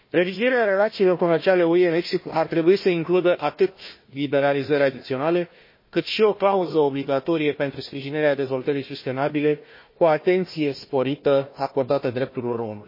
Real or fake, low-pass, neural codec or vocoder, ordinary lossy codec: fake; 5.4 kHz; codec, 16 kHz, 1 kbps, FunCodec, trained on Chinese and English, 50 frames a second; MP3, 24 kbps